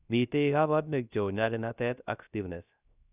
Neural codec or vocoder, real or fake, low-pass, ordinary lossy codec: codec, 16 kHz, 0.3 kbps, FocalCodec; fake; 3.6 kHz; none